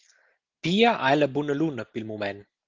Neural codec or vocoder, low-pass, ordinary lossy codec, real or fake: none; 7.2 kHz; Opus, 16 kbps; real